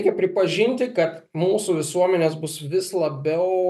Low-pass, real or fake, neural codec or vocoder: 14.4 kHz; real; none